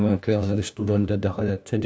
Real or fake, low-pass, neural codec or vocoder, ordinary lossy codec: fake; none; codec, 16 kHz, 1 kbps, FunCodec, trained on LibriTTS, 50 frames a second; none